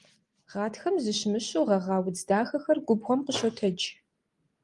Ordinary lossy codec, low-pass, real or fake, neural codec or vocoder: Opus, 32 kbps; 10.8 kHz; real; none